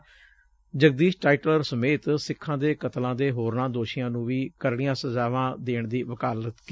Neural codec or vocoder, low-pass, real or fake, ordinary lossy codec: none; none; real; none